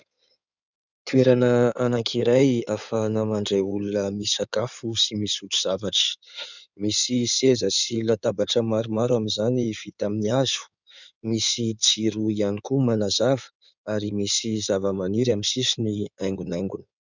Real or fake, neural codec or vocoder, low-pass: fake; codec, 16 kHz in and 24 kHz out, 2.2 kbps, FireRedTTS-2 codec; 7.2 kHz